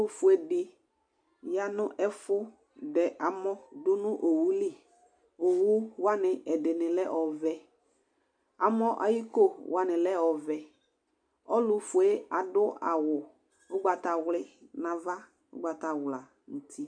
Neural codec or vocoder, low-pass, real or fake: none; 9.9 kHz; real